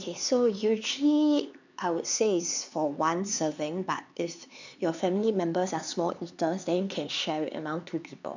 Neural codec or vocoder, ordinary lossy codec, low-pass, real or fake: codec, 16 kHz, 4 kbps, X-Codec, HuBERT features, trained on LibriSpeech; none; 7.2 kHz; fake